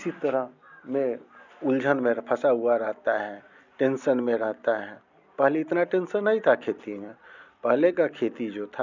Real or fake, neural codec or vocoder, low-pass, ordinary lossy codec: real; none; 7.2 kHz; none